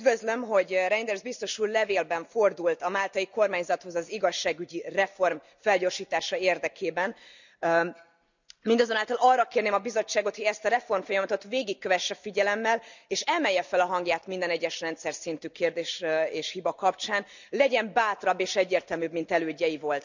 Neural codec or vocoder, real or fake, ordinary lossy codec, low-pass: none; real; none; 7.2 kHz